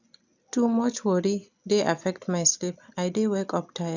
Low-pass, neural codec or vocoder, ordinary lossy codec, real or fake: 7.2 kHz; none; none; real